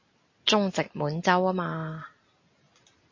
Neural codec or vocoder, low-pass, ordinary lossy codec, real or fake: none; 7.2 kHz; MP3, 32 kbps; real